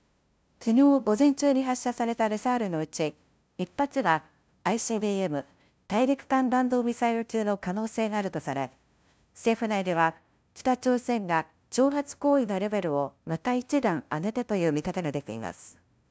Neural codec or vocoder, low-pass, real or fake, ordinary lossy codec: codec, 16 kHz, 0.5 kbps, FunCodec, trained on LibriTTS, 25 frames a second; none; fake; none